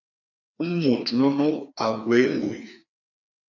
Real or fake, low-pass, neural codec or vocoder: fake; 7.2 kHz; codec, 16 kHz, 2 kbps, FreqCodec, larger model